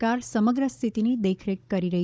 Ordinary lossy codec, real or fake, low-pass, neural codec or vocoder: none; fake; none; codec, 16 kHz, 16 kbps, FunCodec, trained on Chinese and English, 50 frames a second